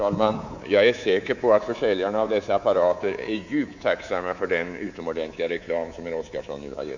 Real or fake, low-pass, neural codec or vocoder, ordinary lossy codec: fake; 7.2 kHz; codec, 24 kHz, 3.1 kbps, DualCodec; none